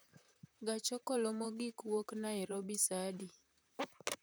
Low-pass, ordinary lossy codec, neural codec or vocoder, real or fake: none; none; vocoder, 44.1 kHz, 128 mel bands, Pupu-Vocoder; fake